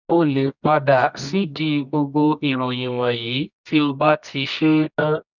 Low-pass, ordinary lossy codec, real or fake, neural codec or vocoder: 7.2 kHz; none; fake; codec, 24 kHz, 0.9 kbps, WavTokenizer, medium music audio release